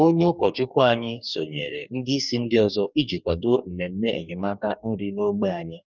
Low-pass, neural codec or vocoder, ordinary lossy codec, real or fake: 7.2 kHz; codec, 44.1 kHz, 2.6 kbps, DAC; none; fake